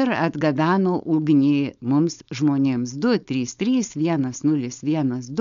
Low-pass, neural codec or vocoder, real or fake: 7.2 kHz; codec, 16 kHz, 4.8 kbps, FACodec; fake